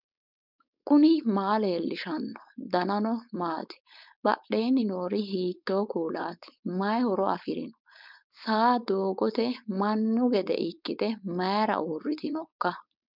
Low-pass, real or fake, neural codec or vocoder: 5.4 kHz; fake; codec, 16 kHz, 4.8 kbps, FACodec